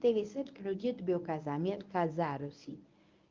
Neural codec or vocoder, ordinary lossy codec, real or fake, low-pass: codec, 24 kHz, 0.9 kbps, WavTokenizer, medium speech release version 1; Opus, 24 kbps; fake; 7.2 kHz